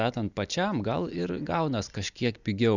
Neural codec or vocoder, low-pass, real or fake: none; 7.2 kHz; real